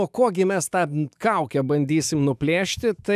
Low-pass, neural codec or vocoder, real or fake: 14.4 kHz; autoencoder, 48 kHz, 128 numbers a frame, DAC-VAE, trained on Japanese speech; fake